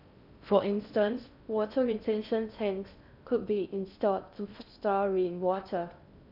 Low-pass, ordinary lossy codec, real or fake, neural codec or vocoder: 5.4 kHz; none; fake; codec, 16 kHz in and 24 kHz out, 0.6 kbps, FocalCodec, streaming, 4096 codes